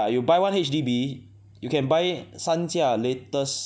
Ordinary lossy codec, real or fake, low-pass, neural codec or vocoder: none; real; none; none